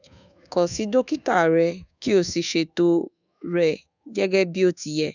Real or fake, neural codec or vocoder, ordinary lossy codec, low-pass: fake; codec, 24 kHz, 1.2 kbps, DualCodec; none; 7.2 kHz